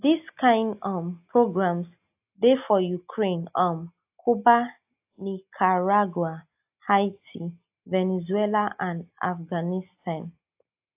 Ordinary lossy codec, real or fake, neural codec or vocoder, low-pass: AAC, 32 kbps; real; none; 3.6 kHz